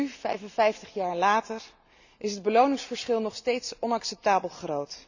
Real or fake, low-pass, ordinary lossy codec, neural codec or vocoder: real; 7.2 kHz; none; none